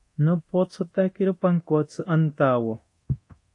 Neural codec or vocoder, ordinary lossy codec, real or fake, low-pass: codec, 24 kHz, 0.9 kbps, DualCodec; AAC, 48 kbps; fake; 10.8 kHz